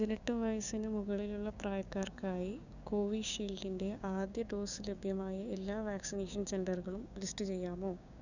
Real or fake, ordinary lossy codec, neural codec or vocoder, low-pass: fake; none; codec, 16 kHz, 6 kbps, DAC; 7.2 kHz